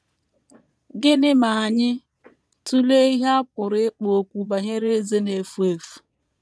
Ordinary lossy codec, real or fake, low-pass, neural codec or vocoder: none; fake; none; vocoder, 22.05 kHz, 80 mel bands, Vocos